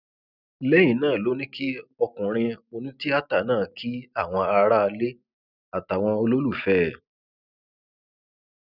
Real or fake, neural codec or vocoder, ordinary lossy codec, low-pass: real; none; none; 5.4 kHz